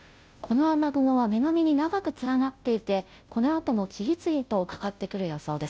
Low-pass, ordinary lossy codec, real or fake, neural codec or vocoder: none; none; fake; codec, 16 kHz, 0.5 kbps, FunCodec, trained on Chinese and English, 25 frames a second